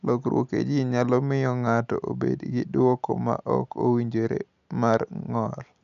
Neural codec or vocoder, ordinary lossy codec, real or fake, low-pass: none; none; real; 7.2 kHz